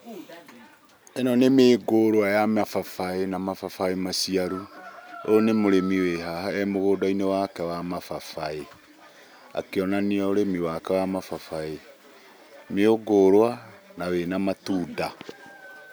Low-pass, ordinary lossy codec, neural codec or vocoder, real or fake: none; none; none; real